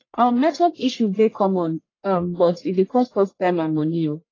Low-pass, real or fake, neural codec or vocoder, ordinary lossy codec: 7.2 kHz; fake; codec, 44.1 kHz, 1.7 kbps, Pupu-Codec; AAC, 32 kbps